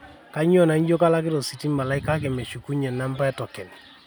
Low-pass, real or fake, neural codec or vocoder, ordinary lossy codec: none; real; none; none